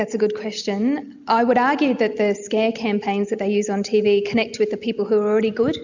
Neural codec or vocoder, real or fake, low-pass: none; real; 7.2 kHz